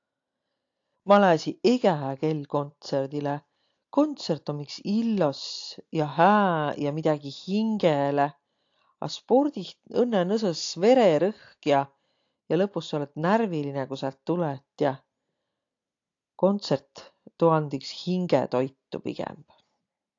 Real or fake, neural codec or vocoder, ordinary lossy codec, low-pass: real; none; AAC, 48 kbps; 7.2 kHz